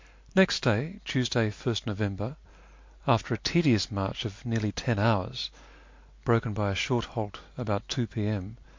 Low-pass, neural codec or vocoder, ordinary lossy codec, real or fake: 7.2 kHz; none; MP3, 48 kbps; real